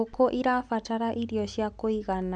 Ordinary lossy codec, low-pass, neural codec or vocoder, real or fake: none; 10.8 kHz; none; real